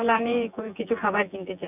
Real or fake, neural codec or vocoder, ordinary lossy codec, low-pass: fake; vocoder, 24 kHz, 100 mel bands, Vocos; none; 3.6 kHz